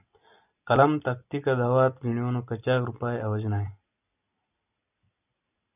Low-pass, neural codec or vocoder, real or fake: 3.6 kHz; none; real